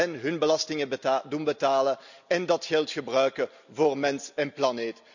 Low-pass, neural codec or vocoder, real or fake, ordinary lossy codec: 7.2 kHz; none; real; none